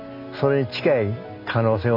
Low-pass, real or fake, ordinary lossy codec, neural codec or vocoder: 5.4 kHz; real; none; none